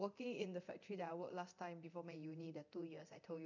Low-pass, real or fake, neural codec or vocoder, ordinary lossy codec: 7.2 kHz; fake; codec, 24 kHz, 0.9 kbps, DualCodec; none